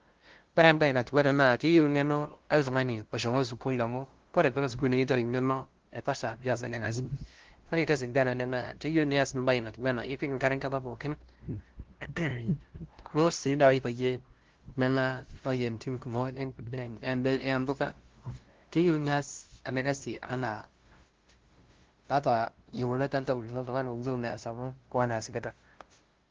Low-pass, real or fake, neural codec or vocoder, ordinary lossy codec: 7.2 kHz; fake; codec, 16 kHz, 0.5 kbps, FunCodec, trained on LibriTTS, 25 frames a second; Opus, 16 kbps